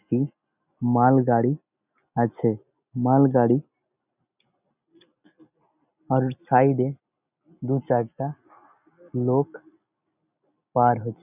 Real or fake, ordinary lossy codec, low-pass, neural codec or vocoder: real; none; 3.6 kHz; none